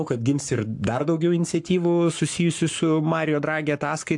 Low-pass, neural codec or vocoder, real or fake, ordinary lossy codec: 10.8 kHz; codec, 44.1 kHz, 7.8 kbps, Pupu-Codec; fake; AAC, 64 kbps